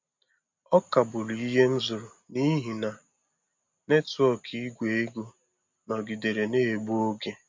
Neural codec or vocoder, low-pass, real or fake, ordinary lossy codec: none; 7.2 kHz; real; MP3, 48 kbps